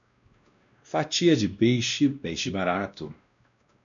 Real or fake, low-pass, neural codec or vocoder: fake; 7.2 kHz; codec, 16 kHz, 1 kbps, X-Codec, WavLM features, trained on Multilingual LibriSpeech